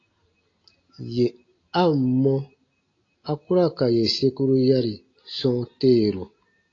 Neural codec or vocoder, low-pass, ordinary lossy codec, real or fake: none; 7.2 kHz; AAC, 32 kbps; real